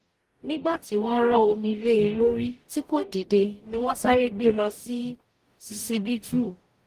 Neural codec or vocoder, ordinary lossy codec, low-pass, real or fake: codec, 44.1 kHz, 0.9 kbps, DAC; Opus, 24 kbps; 14.4 kHz; fake